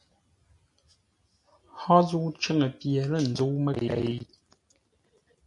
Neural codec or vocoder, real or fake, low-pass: none; real; 10.8 kHz